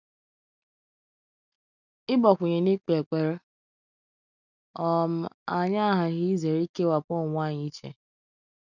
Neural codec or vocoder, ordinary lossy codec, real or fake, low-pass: none; none; real; 7.2 kHz